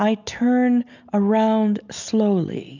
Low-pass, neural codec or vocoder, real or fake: 7.2 kHz; none; real